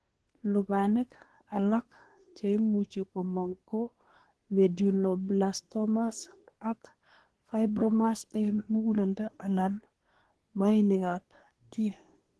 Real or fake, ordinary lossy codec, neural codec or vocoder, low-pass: fake; Opus, 16 kbps; codec, 24 kHz, 1 kbps, SNAC; 10.8 kHz